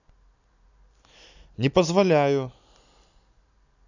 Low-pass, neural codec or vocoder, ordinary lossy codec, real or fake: 7.2 kHz; none; none; real